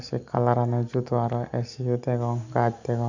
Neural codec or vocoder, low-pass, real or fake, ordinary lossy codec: none; 7.2 kHz; real; none